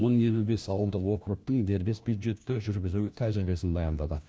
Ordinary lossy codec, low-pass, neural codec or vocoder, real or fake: none; none; codec, 16 kHz, 1 kbps, FunCodec, trained on LibriTTS, 50 frames a second; fake